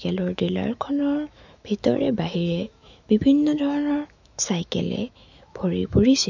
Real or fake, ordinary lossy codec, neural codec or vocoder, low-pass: fake; none; autoencoder, 48 kHz, 128 numbers a frame, DAC-VAE, trained on Japanese speech; 7.2 kHz